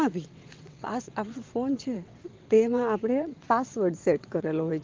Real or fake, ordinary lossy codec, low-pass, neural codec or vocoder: fake; Opus, 32 kbps; 7.2 kHz; vocoder, 22.05 kHz, 80 mel bands, WaveNeXt